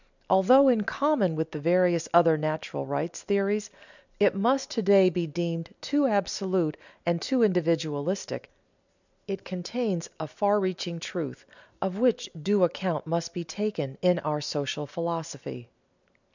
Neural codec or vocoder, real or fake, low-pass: none; real; 7.2 kHz